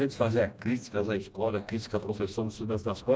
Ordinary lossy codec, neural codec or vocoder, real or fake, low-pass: none; codec, 16 kHz, 1 kbps, FreqCodec, smaller model; fake; none